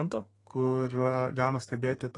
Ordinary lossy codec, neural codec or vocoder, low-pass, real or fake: AAC, 32 kbps; codec, 32 kHz, 1.9 kbps, SNAC; 10.8 kHz; fake